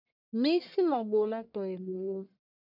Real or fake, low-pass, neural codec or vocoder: fake; 5.4 kHz; codec, 44.1 kHz, 1.7 kbps, Pupu-Codec